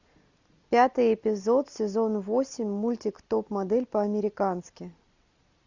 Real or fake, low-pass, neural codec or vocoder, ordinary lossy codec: real; 7.2 kHz; none; Opus, 64 kbps